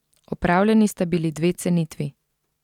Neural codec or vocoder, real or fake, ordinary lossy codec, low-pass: vocoder, 44.1 kHz, 128 mel bands every 512 samples, BigVGAN v2; fake; none; 19.8 kHz